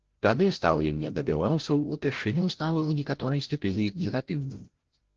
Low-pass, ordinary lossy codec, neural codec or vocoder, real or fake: 7.2 kHz; Opus, 16 kbps; codec, 16 kHz, 0.5 kbps, FreqCodec, larger model; fake